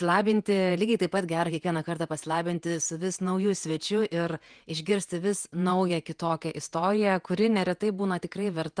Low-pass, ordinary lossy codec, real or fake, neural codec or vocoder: 9.9 kHz; Opus, 32 kbps; fake; vocoder, 48 kHz, 128 mel bands, Vocos